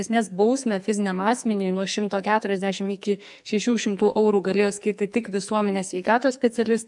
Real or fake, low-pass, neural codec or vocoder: fake; 10.8 kHz; codec, 44.1 kHz, 2.6 kbps, SNAC